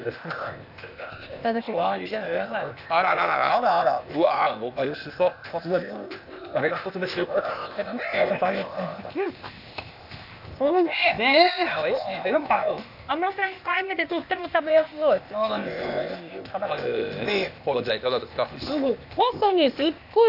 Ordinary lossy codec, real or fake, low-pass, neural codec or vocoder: none; fake; 5.4 kHz; codec, 16 kHz, 0.8 kbps, ZipCodec